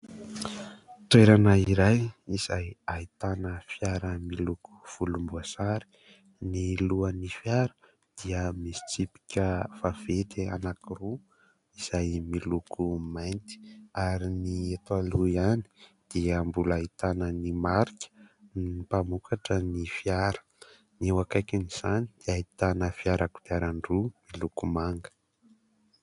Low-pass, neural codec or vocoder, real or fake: 10.8 kHz; none; real